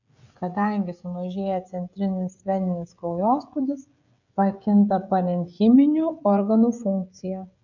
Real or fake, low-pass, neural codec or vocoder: fake; 7.2 kHz; codec, 16 kHz, 16 kbps, FreqCodec, smaller model